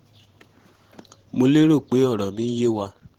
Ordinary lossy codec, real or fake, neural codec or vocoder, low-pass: Opus, 16 kbps; fake; vocoder, 44.1 kHz, 128 mel bands every 512 samples, BigVGAN v2; 19.8 kHz